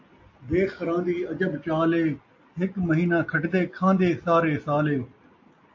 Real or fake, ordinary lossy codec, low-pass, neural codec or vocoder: real; AAC, 48 kbps; 7.2 kHz; none